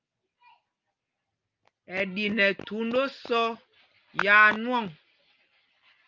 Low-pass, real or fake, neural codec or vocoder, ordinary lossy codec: 7.2 kHz; real; none; Opus, 24 kbps